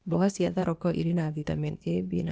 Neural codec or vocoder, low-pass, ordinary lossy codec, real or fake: codec, 16 kHz, 0.8 kbps, ZipCodec; none; none; fake